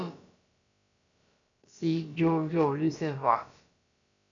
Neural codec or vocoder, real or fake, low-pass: codec, 16 kHz, about 1 kbps, DyCAST, with the encoder's durations; fake; 7.2 kHz